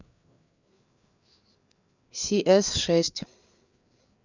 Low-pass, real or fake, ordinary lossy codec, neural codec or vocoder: 7.2 kHz; fake; none; codec, 16 kHz, 4 kbps, FreqCodec, larger model